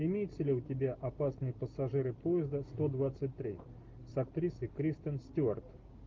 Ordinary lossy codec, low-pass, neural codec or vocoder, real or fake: Opus, 32 kbps; 7.2 kHz; none; real